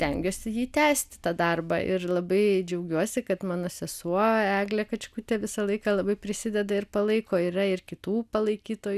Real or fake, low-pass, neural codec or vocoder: real; 14.4 kHz; none